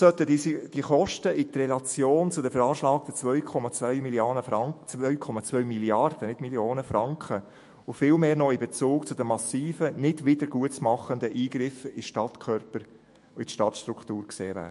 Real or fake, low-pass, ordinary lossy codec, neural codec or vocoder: fake; 14.4 kHz; MP3, 48 kbps; autoencoder, 48 kHz, 128 numbers a frame, DAC-VAE, trained on Japanese speech